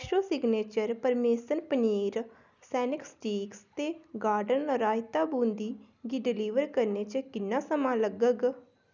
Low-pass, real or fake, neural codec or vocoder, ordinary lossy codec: 7.2 kHz; real; none; none